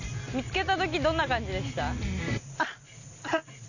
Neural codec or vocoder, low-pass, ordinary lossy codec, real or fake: none; 7.2 kHz; none; real